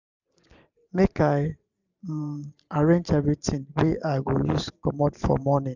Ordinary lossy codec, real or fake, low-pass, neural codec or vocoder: none; fake; 7.2 kHz; vocoder, 44.1 kHz, 128 mel bands every 512 samples, BigVGAN v2